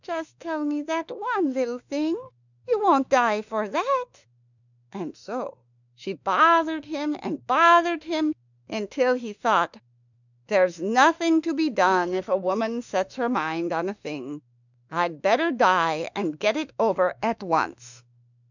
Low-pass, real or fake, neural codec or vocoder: 7.2 kHz; fake; autoencoder, 48 kHz, 32 numbers a frame, DAC-VAE, trained on Japanese speech